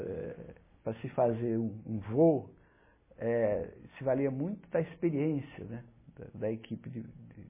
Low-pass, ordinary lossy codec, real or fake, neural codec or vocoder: 3.6 kHz; MP3, 16 kbps; real; none